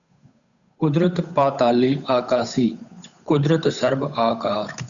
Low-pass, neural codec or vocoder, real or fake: 7.2 kHz; codec, 16 kHz, 8 kbps, FunCodec, trained on Chinese and English, 25 frames a second; fake